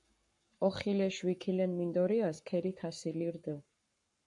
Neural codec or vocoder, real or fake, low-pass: codec, 44.1 kHz, 7.8 kbps, Pupu-Codec; fake; 10.8 kHz